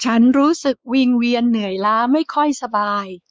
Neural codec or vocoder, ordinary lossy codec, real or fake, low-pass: codec, 16 kHz, 4 kbps, X-Codec, WavLM features, trained on Multilingual LibriSpeech; none; fake; none